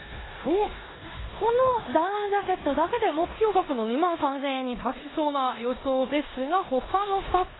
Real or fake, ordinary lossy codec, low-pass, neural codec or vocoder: fake; AAC, 16 kbps; 7.2 kHz; codec, 16 kHz in and 24 kHz out, 0.9 kbps, LongCat-Audio-Codec, four codebook decoder